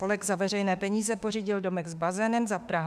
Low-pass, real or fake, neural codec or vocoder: 14.4 kHz; fake; autoencoder, 48 kHz, 32 numbers a frame, DAC-VAE, trained on Japanese speech